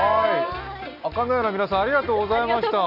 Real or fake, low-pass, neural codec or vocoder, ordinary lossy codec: real; 5.4 kHz; none; none